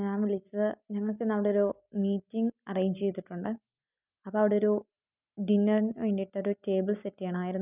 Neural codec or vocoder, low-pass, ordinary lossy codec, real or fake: none; 3.6 kHz; none; real